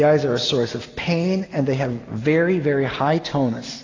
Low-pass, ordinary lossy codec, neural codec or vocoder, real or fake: 7.2 kHz; AAC, 32 kbps; none; real